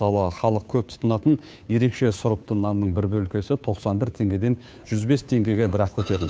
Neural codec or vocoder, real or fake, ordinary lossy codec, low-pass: codec, 16 kHz, 2 kbps, FunCodec, trained on Chinese and English, 25 frames a second; fake; none; none